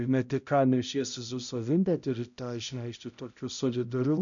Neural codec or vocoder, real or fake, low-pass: codec, 16 kHz, 0.5 kbps, X-Codec, HuBERT features, trained on balanced general audio; fake; 7.2 kHz